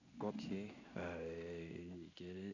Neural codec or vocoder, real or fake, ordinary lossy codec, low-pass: codec, 16 kHz in and 24 kHz out, 1 kbps, XY-Tokenizer; fake; none; 7.2 kHz